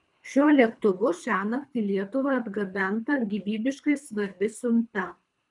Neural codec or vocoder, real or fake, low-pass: codec, 24 kHz, 3 kbps, HILCodec; fake; 10.8 kHz